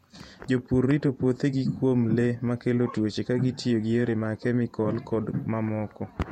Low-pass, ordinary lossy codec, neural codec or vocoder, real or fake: 19.8 kHz; MP3, 64 kbps; vocoder, 48 kHz, 128 mel bands, Vocos; fake